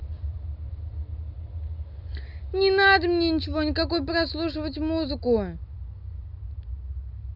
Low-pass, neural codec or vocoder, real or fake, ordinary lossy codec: 5.4 kHz; none; real; none